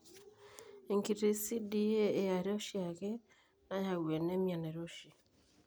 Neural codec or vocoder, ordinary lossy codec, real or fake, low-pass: none; none; real; none